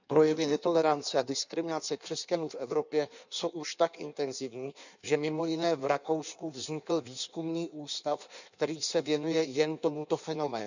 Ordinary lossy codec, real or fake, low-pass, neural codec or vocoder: none; fake; 7.2 kHz; codec, 16 kHz in and 24 kHz out, 1.1 kbps, FireRedTTS-2 codec